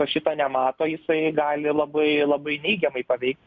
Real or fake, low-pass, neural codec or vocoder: real; 7.2 kHz; none